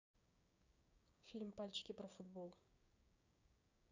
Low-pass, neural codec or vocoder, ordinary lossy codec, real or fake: 7.2 kHz; codec, 16 kHz, 6 kbps, DAC; Opus, 64 kbps; fake